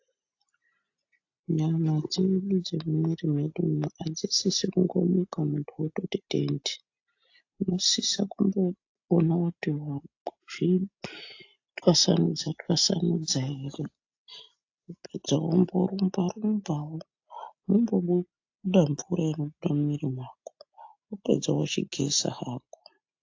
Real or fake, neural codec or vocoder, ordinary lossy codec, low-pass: real; none; AAC, 48 kbps; 7.2 kHz